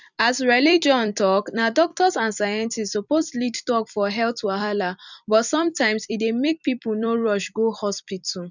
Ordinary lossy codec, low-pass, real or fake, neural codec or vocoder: none; 7.2 kHz; fake; vocoder, 44.1 kHz, 128 mel bands every 256 samples, BigVGAN v2